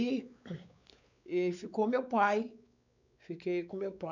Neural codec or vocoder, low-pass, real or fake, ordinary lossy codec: codec, 16 kHz, 4 kbps, X-Codec, WavLM features, trained on Multilingual LibriSpeech; 7.2 kHz; fake; none